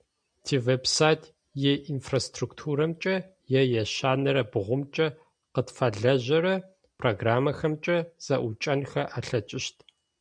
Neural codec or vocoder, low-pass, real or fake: none; 9.9 kHz; real